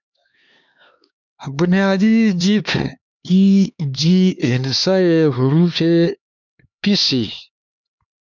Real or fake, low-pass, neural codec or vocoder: fake; 7.2 kHz; codec, 16 kHz, 2 kbps, X-Codec, HuBERT features, trained on LibriSpeech